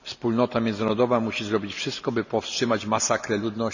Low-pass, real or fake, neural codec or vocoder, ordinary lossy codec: 7.2 kHz; real; none; none